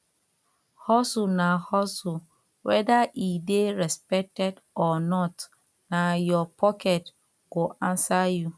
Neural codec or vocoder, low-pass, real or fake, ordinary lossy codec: none; none; real; none